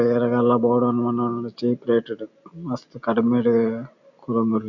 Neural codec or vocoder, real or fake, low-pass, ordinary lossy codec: none; real; 7.2 kHz; none